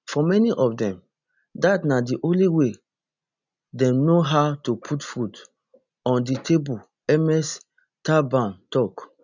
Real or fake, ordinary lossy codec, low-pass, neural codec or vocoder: real; none; 7.2 kHz; none